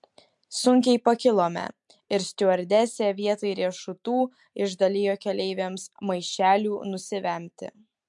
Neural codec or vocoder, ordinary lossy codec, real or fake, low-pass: none; MP3, 64 kbps; real; 10.8 kHz